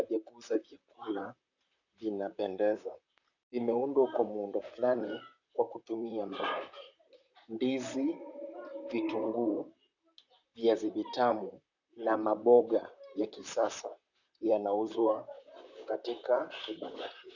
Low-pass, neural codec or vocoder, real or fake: 7.2 kHz; vocoder, 44.1 kHz, 128 mel bands, Pupu-Vocoder; fake